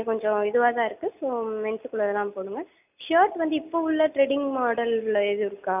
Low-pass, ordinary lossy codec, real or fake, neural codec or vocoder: 3.6 kHz; none; real; none